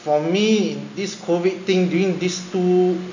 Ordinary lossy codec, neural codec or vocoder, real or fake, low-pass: none; none; real; 7.2 kHz